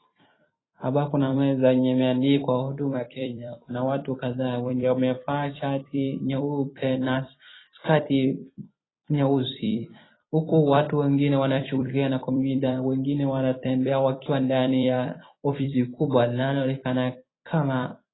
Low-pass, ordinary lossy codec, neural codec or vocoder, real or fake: 7.2 kHz; AAC, 16 kbps; vocoder, 44.1 kHz, 128 mel bands every 256 samples, BigVGAN v2; fake